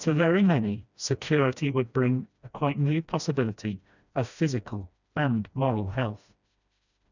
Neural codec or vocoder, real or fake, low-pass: codec, 16 kHz, 1 kbps, FreqCodec, smaller model; fake; 7.2 kHz